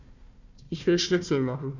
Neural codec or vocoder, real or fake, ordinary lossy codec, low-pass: codec, 16 kHz, 1 kbps, FunCodec, trained on Chinese and English, 50 frames a second; fake; none; 7.2 kHz